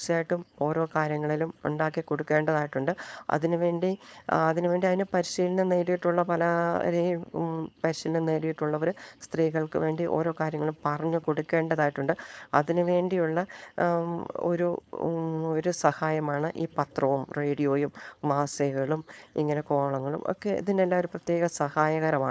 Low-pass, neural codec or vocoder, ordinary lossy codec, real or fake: none; codec, 16 kHz, 4.8 kbps, FACodec; none; fake